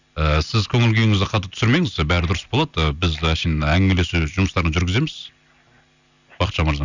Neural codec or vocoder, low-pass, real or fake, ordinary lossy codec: none; 7.2 kHz; real; none